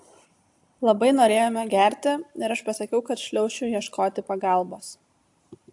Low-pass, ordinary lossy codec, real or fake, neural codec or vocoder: 10.8 kHz; AAC, 64 kbps; real; none